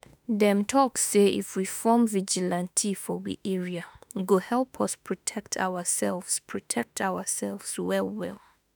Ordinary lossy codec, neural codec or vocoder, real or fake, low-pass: none; autoencoder, 48 kHz, 32 numbers a frame, DAC-VAE, trained on Japanese speech; fake; none